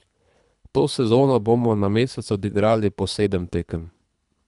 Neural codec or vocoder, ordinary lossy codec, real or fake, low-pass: codec, 24 kHz, 3 kbps, HILCodec; none; fake; 10.8 kHz